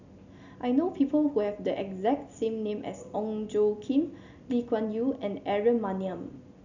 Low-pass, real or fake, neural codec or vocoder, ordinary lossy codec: 7.2 kHz; real; none; none